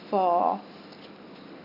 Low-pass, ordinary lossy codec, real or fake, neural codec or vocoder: 5.4 kHz; none; real; none